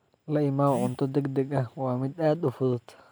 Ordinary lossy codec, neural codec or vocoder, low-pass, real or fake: none; none; none; real